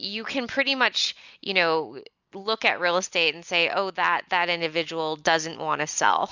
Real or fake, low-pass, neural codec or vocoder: real; 7.2 kHz; none